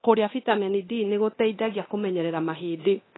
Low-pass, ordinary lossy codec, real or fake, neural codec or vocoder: 7.2 kHz; AAC, 16 kbps; fake; codec, 24 kHz, 0.9 kbps, DualCodec